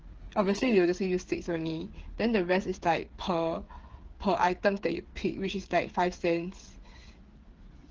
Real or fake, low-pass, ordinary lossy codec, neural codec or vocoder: fake; 7.2 kHz; Opus, 16 kbps; codec, 16 kHz, 8 kbps, FreqCodec, smaller model